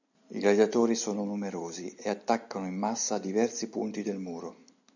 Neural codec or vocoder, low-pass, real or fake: none; 7.2 kHz; real